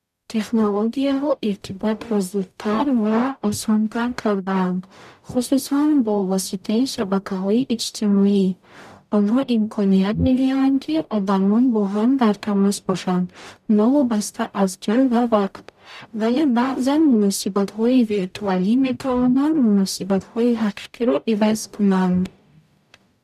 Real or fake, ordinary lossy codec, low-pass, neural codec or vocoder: fake; none; 14.4 kHz; codec, 44.1 kHz, 0.9 kbps, DAC